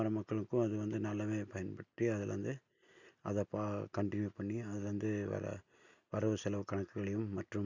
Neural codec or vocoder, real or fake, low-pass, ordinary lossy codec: none; real; 7.2 kHz; none